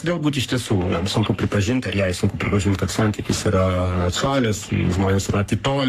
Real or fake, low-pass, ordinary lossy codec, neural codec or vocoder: fake; 14.4 kHz; AAC, 64 kbps; codec, 44.1 kHz, 3.4 kbps, Pupu-Codec